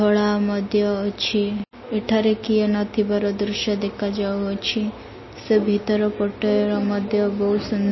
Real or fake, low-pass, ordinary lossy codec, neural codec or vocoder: real; 7.2 kHz; MP3, 24 kbps; none